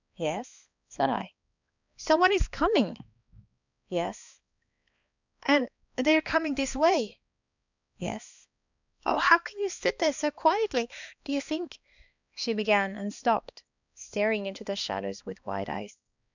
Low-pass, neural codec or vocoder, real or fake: 7.2 kHz; codec, 16 kHz, 2 kbps, X-Codec, HuBERT features, trained on balanced general audio; fake